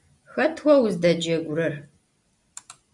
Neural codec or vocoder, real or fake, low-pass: none; real; 10.8 kHz